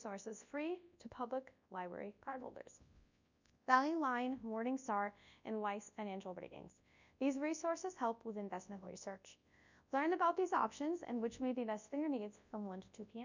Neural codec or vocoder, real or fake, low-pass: codec, 24 kHz, 0.9 kbps, WavTokenizer, large speech release; fake; 7.2 kHz